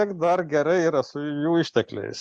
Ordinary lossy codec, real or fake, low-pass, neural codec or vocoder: Opus, 64 kbps; real; 9.9 kHz; none